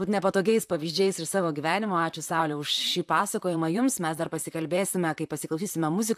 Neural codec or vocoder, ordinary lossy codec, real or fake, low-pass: vocoder, 44.1 kHz, 128 mel bands, Pupu-Vocoder; AAC, 96 kbps; fake; 14.4 kHz